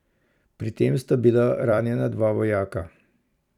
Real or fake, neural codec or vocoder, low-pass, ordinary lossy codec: fake; vocoder, 44.1 kHz, 128 mel bands every 256 samples, BigVGAN v2; 19.8 kHz; none